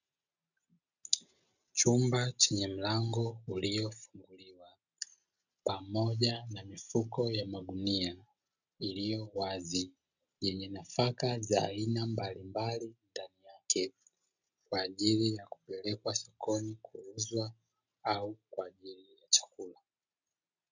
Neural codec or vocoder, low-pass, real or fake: none; 7.2 kHz; real